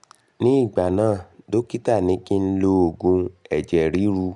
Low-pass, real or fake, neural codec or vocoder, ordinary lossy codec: 10.8 kHz; real; none; none